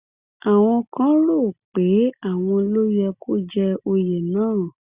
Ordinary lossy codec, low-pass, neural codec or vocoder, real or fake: Opus, 64 kbps; 3.6 kHz; none; real